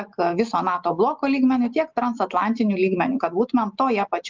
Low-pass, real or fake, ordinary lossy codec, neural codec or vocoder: 7.2 kHz; real; Opus, 24 kbps; none